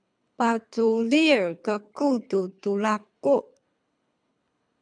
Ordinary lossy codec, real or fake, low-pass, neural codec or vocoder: MP3, 96 kbps; fake; 9.9 kHz; codec, 24 kHz, 3 kbps, HILCodec